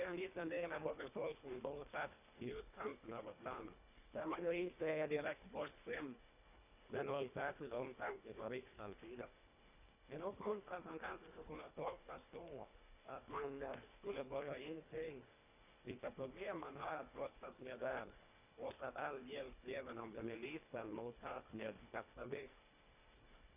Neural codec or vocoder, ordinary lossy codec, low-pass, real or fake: codec, 24 kHz, 1.5 kbps, HILCodec; none; 3.6 kHz; fake